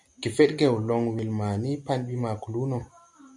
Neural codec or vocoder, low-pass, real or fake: none; 10.8 kHz; real